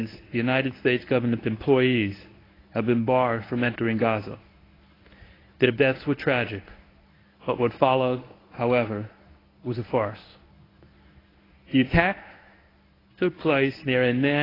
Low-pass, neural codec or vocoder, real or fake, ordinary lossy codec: 5.4 kHz; codec, 24 kHz, 0.9 kbps, WavTokenizer, medium speech release version 1; fake; AAC, 24 kbps